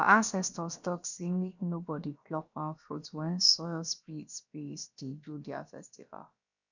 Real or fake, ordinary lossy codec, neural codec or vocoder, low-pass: fake; none; codec, 16 kHz, about 1 kbps, DyCAST, with the encoder's durations; 7.2 kHz